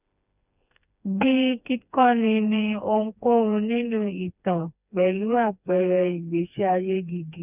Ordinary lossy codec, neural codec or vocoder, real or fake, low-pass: none; codec, 16 kHz, 2 kbps, FreqCodec, smaller model; fake; 3.6 kHz